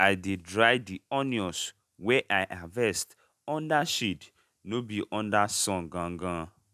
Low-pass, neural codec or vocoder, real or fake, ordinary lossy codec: 14.4 kHz; none; real; none